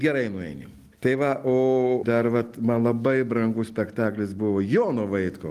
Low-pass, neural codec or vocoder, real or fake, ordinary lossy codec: 14.4 kHz; none; real; Opus, 24 kbps